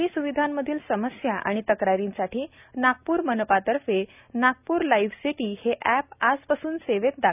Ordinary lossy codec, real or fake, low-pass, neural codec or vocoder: none; real; 3.6 kHz; none